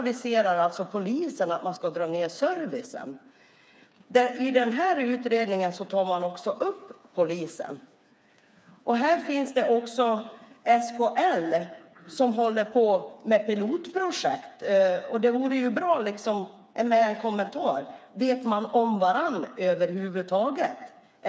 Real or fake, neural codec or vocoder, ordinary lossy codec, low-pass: fake; codec, 16 kHz, 4 kbps, FreqCodec, smaller model; none; none